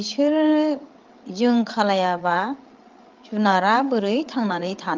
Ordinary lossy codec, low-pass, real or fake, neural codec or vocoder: Opus, 32 kbps; 7.2 kHz; fake; codec, 16 kHz, 8 kbps, FreqCodec, larger model